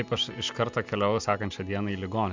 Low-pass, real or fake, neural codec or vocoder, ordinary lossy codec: 7.2 kHz; real; none; MP3, 64 kbps